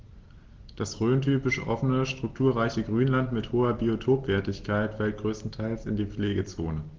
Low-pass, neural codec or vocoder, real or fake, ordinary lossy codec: 7.2 kHz; none; real; Opus, 16 kbps